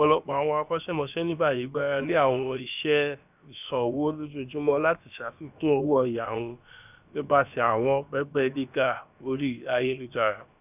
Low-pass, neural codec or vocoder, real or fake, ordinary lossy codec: 3.6 kHz; codec, 16 kHz, about 1 kbps, DyCAST, with the encoder's durations; fake; none